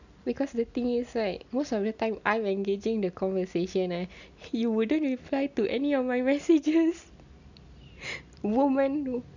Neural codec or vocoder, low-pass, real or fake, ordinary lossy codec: none; 7.2 kHz; real; none